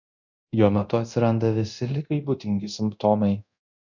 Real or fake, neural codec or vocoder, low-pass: fake; codec, 24 kHz, 0.9 kbps, DualCodec; 7.2 kHz